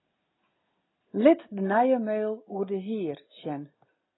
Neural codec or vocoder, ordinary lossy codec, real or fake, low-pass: none; AAC, 16 kbps; real; 7.2 kHz